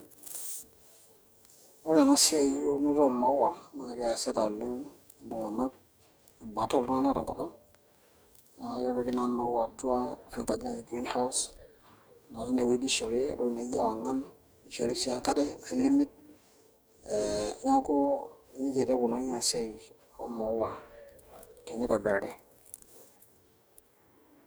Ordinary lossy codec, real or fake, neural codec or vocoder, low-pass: none; fake; codec, 44.1 kHz, 2.6 kbps, DAC; none